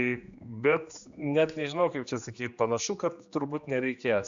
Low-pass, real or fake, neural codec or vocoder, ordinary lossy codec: 7.2 kHz; fake; codec, 16 kHz, 2 kbps, X-Codec, HuBERT features, trained on general audio; Opus, 64 kbps